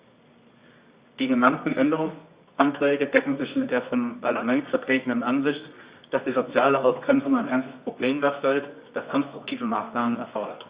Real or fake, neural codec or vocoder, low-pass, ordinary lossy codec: fake; codec, 24 kHz, 0.9 kbps, WavTokenizer, medium music audio release; 3.6 kHz; Opus, 64 kbps